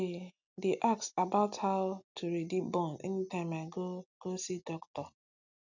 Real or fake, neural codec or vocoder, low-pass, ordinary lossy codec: real; none; 7.2 kHz; none